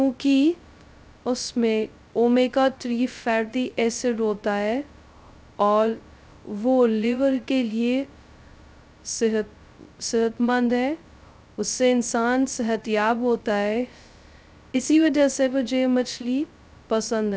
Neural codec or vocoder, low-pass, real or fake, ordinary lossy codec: codec, 16 kHz, 0.2 kbps, FocalCodec; none; fake; none